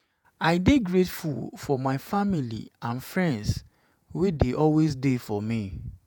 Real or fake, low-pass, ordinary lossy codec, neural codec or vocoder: fake; none; none; vocoder, 48 kHz, 128 mel bands, Vocos